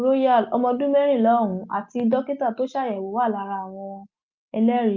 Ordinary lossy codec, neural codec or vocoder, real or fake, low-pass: Opus, 24 kbps; none; real; 7.2 kHz